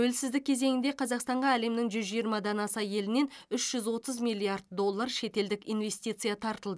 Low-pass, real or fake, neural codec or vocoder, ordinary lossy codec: none; real; none; none